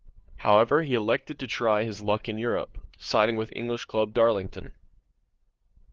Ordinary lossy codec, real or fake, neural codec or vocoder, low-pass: Opus, 16 kbps; fake; codec, 16 kHz, 2 kbps, FunCodec, trained on LibriTTS, 25 frames a second; 7.2 kHz